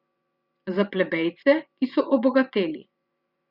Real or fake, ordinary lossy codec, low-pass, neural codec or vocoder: fake; Opus, 64 kbps; 5.4 kHz; vocoder, 24 kHz, 100 mel bands, Vocos